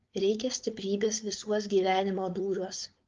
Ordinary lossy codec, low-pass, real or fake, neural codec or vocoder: Opus, 32 kbps; 7.2 kHz; fake; codec, 16 kHz, 4.8 kbps, FACodec